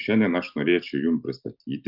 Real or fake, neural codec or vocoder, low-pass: real; none; 5.4 kHz